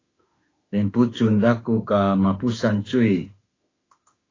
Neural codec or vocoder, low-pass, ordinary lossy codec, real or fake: autoencoder, 48 kHz, 32 numbers a frame, DAC-VAE, trained on Japanese speech; 7.2 kHz; AAC, 32 kbps; fake